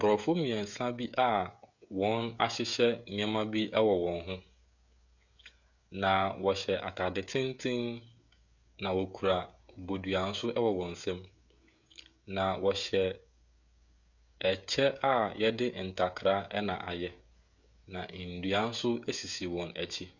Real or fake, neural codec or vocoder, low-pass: fake; codec, 16 kHz, 16 kbps, FreqCodec, smaller model; 7.2 kHz